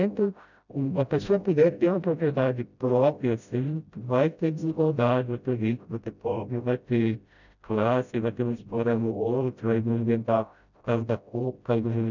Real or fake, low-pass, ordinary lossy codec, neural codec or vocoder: fake; 7.2 kHz; none; codec, 16 kHz, 0.5 kbps, FreqCodec, smaller model